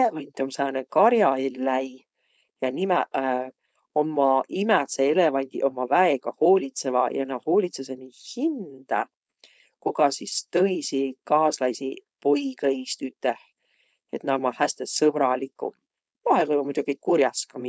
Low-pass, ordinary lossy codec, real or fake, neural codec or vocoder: none; none; fake; codec, 16 kHz, 4.8 kbps, FACodec